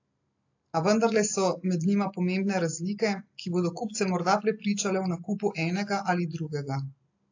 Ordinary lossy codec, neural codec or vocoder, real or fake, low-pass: AAC, 48 kbps; none; real; 7.2 kHz